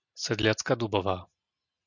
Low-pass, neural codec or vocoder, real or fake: 7.2 kHz; none; real